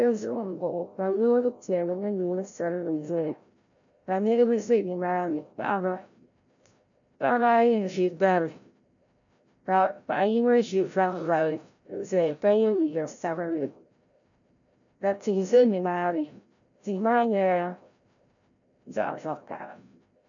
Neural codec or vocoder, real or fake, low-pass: codec, 16 kHz, 0.5 kbps, FreqCodec, larger model; fake; 7.2 kHz